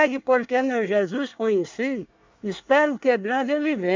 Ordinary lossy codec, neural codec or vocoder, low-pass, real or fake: MP3, 64 kbps; codec, 24 kHz, 1 kbps, SNAC; 7.2 kHz; fake